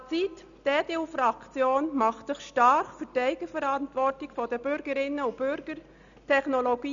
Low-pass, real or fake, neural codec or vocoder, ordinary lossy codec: 7.2 kHz; real; none; MP3, 96 kbps